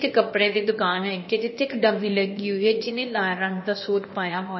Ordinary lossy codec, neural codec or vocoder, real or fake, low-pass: MP3, 24 kbps; codec, 16 kHz, 0.8 kbps, ZipCodec; fake; 7.2 kHz